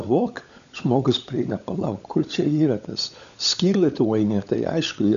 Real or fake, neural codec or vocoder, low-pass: fake; codec, 16 kHz, 16 kbps, FunCodec, trained on LibriTTS, 50 frames a second; 7.2 kHz